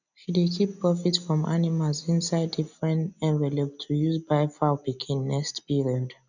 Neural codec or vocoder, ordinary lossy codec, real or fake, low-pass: none; none; real; 7.2 kHz